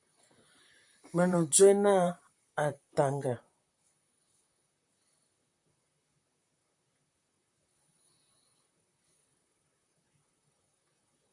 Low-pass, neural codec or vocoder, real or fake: 10.8 kHz; vocoder, 44.1 kHz, 128 mel bands, Pupu-Vocoder; fake